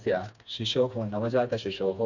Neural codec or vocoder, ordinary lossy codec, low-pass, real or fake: codec, 16 kHz, 2 kbps, FreqCodec, smaller model; none; 7.2 kHz; fake